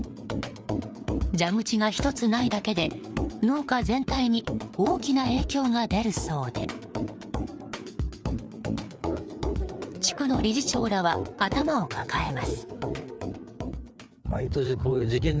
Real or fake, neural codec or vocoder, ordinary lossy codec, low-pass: fake; codec, 16 kHz, 4 kbps, FreqCodec, larger model; none; none